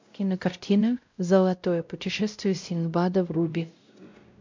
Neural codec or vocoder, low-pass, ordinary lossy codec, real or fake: codec, 16 kHz, 0.5 kbps, X-Codec, WavLM features, trained on Multilingual LibriSpeech; 7.2 kHz; MP3, 64 kbps; fake